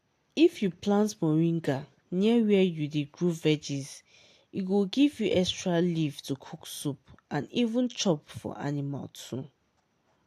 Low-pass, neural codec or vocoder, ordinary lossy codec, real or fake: 14.4 kHz; none; AAC, 64 kbps; real